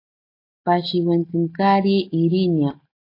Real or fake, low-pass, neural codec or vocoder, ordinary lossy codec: real; 5.4 kHz; none; AAC, 32 kbps